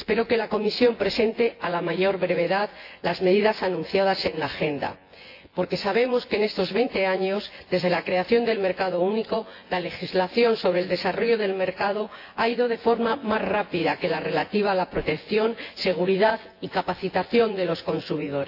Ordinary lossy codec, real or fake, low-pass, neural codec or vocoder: AAC, 32 kbps; fake; 5.4 kHz; vocoder, 24 kHz, 100 mel bands, Vocos